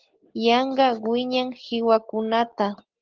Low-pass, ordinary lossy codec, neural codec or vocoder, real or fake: 7.2 kHz; Opus, 16 kbps; none; real